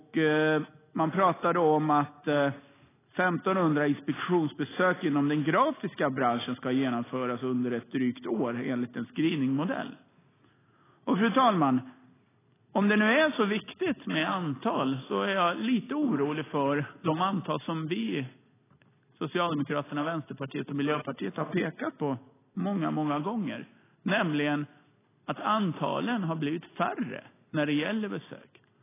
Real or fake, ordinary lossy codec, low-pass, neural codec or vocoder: real; AAC, 16 kbps; 3.6 kHz; none